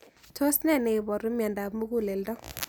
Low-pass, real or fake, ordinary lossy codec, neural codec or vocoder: none; real; none; none